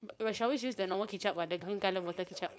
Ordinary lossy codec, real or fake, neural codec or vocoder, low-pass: none; fake; codec, 16 kHz, 4.8 kbps, FACodec; none